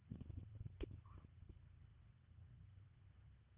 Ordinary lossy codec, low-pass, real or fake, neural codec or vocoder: Opus, 16 kbps; 3.6 kHz; fake; codec, 24 kHz, 1.5 kbps, HILCodec